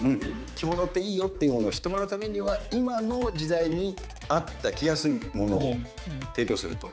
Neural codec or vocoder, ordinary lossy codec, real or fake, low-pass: codec, 16 kHz, 4 kbps, X-Codec, HuBERT features, trained on balanced general audio; none; fake; none